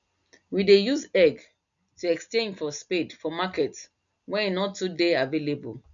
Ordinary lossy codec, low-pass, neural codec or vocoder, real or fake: none; 7.2 kHz; none; real